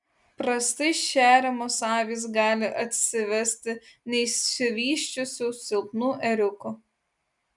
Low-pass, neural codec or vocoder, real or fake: 10.8 kHz; none; real